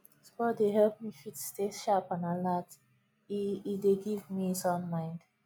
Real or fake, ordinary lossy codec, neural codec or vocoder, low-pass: real; none; none; none